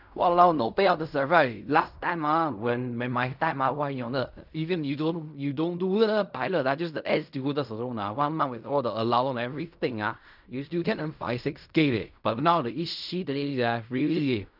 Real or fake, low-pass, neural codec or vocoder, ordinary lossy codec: fake; 5.4 kHz; codec, 16 kHz in and 24 kHz out, 0.4 kbps, LongCat-Audio-Codec, fine tuned four codebook decoder; none